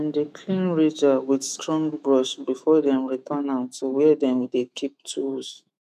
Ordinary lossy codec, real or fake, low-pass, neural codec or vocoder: none; fake; 14.4 kHz; autoencoder, 48 kHz, 128 numbers a frame, DAC-VAE, trained on Japanese speech